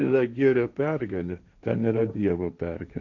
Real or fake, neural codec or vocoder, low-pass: fake; codec, 16 kHz, 1.1 kbps, Voila-Tokenizer; 7.2 kHz